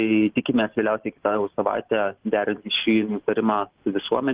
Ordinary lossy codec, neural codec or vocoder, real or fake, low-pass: Opus, 16 kbps; none; real; 3.6 kHz